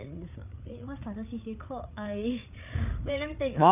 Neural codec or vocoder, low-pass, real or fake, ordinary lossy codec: codec, 16 kHz, 8 kbps, FreqCodec, larger model; 3.6 kHz; fake; none